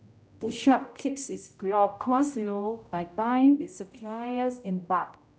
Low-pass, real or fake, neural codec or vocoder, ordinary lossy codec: none; fake; codec, 16 kHz, 0.5 kbps, X-Codec, HuBERT features, trained on general audio; none